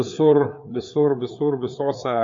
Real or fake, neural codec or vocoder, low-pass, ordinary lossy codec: fake; codec, 16 kHz, 4 kbps, FreqCodec, larger model; 7.2 kHz; MP3, 48 kbps